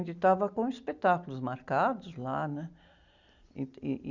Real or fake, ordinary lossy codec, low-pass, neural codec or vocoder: real; Opus, 64 kbps; 7.2 kHz; none